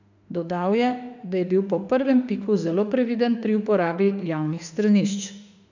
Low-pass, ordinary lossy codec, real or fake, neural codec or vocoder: 7.2 kHz; none; fake; autoencoder, 48 kHz, 32 numbers a frame, DAC-VAE, trained on Japanese speech